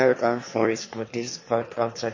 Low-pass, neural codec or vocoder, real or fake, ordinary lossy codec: 7.2 kHz; autoencoder, 22.05 kHz, a latent of 192 numbers a frame, VITS, trained on one speaker; fake; MP3, 32 kbps